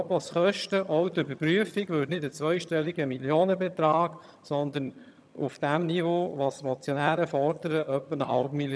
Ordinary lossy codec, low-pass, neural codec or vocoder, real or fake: none; none; vocoder, 22.05 kHz, 80 mel bands, HiFi-GAN; fake